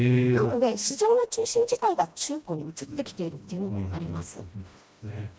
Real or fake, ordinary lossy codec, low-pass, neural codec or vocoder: fake; none; none; codec, 16 kHz, 1 kbps, FreqCodec, smaller model